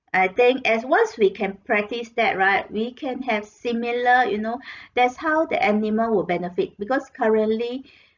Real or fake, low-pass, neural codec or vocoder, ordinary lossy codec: real; 7.2 kHz; none; none